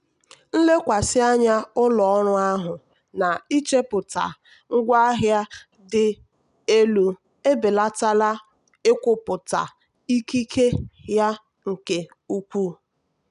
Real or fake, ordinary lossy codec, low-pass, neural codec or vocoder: real; none; 10.8 kHz; none